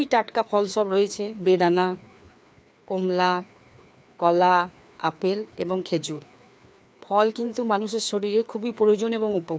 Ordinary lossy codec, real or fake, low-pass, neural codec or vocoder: none; fake; none; codec, 16 kHz, 2 kbps, FreqCodec, larger model